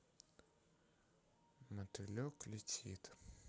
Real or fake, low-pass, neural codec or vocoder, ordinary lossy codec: real; none; none; none